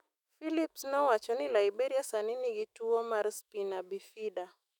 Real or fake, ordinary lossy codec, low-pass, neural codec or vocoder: fake; none; 19.8 kHz; autoencoder, 48 kHz, 128 numbers a frame, DAC-VAE, trained on Japanese speech